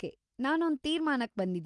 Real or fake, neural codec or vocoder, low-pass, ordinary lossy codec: real; none; 10.8 kHz; Opus, 24 kbps